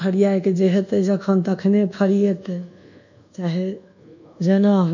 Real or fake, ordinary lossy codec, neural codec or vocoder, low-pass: fake; none; codec, 24 kHz, 1.2 kbps, DualCodec; 7.2 kHz